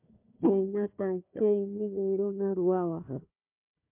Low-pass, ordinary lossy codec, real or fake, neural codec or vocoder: 3.6 kHz; MP3, 32 kbps; fake; codec, 16 kHz, 1 kbps, FunCodec, trained on Chinese and English, 50 frames a second